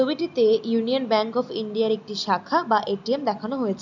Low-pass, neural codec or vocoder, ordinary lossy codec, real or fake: 7.2 kHz; none; none; real